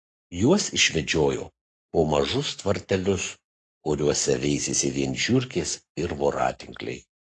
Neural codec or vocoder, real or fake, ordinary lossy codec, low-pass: codec, 44.1 kHz, 7.8 kbps, Pupu-Codec; fake; AAC, 48 kbps; 10.8 kHz